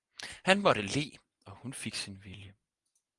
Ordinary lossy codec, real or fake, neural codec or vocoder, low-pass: Opus, 32 kbps; real; none; 10.8 kHz